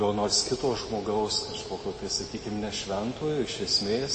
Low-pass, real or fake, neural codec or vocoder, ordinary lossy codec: 10.8 kHz; real; none; MP3, 32 kbps